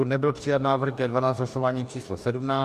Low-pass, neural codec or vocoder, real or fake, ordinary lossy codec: 14.4 kHz; codec, 44.1 kHz, 2.6 kbps, DAC; fake; MP3, 96 kbps